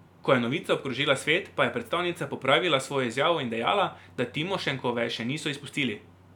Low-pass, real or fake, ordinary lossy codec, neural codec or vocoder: 19.8 kHz; real; none; none